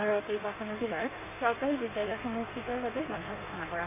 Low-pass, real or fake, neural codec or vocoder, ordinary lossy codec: 3.6 kHz; fake; codec, 16 kHz in and 24 kHz out, 1.1 kbps, FireRedTTS-2 codec; none